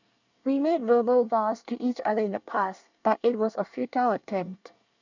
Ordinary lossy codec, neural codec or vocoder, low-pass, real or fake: none; codec, 24 kHz, 1 kbps, SNAC; 7.2 kHz; fake